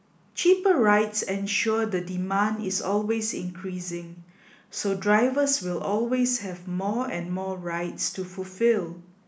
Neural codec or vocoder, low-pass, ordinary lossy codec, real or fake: none; none; none; real